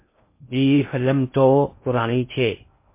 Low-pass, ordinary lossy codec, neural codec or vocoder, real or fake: 3.6 kHz; MP3, 24 kbps; codec, 16 kHz in and 24 kHz out, 0.6 kbps, FocalCodec, streaming, 2048 codes; fake